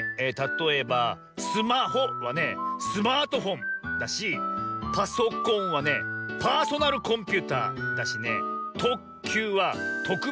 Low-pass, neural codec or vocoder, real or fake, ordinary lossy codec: none; none; real; none